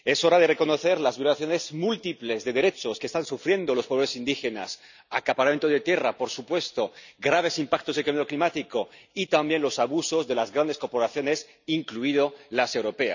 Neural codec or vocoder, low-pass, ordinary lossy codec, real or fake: none; 7.2 kHz; none; real